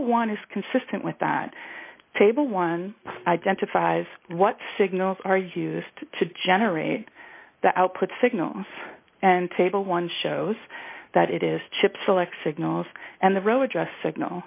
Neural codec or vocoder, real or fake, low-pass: none; real; 3.6 kHz